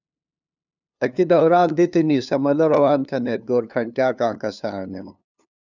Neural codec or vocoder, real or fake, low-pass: codec, 16 kHz, 2 kbps, FunCodec, trained on LibriTTS, 25 frames a second; fake; 7.2 kHz